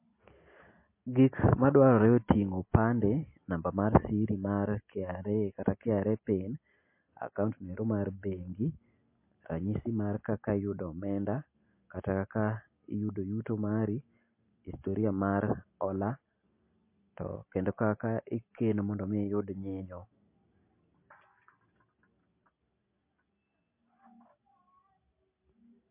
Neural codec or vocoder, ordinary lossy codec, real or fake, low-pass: none; MP3, 24 kbps; real; 3.6 kHz